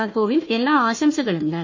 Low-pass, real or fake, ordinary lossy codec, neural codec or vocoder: 7.2 kHz; fake; MP3, 32 kbps; codec, 16 kHz, 1 kbps, FunCodec, trained on Chinese and English, 50 frames a second